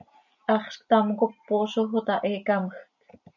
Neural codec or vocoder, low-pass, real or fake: none; 7.2 kHz; real